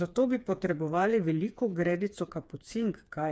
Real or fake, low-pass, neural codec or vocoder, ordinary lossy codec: fake; none; codec, 16 kHz, 4 kbps, FreqCodec, smaller model; none